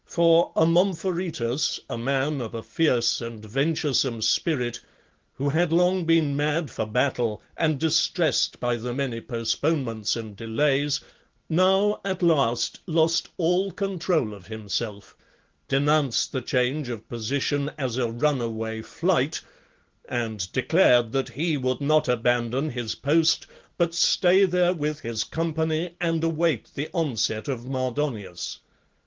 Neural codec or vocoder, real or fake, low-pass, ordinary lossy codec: none; real; 7.2 kHz; Opus, 16 kbps